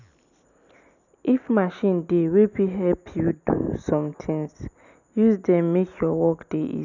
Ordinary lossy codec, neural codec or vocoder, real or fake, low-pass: none; none; real; 7.2 kHz